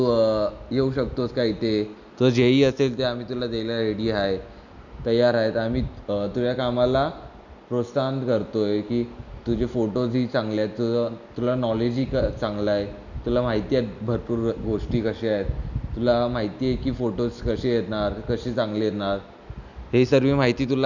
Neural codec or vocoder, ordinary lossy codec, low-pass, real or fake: none; none; 7.2 kHz; real